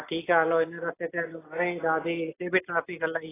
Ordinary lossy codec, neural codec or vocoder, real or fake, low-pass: AAC, 16 kbps; none; real; 3.6 kHz